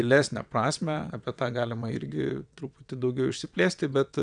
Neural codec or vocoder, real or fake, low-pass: vocoder, 22.05 kHz, 80 mel bands, WaveNeXt; fake; 9.9 kHz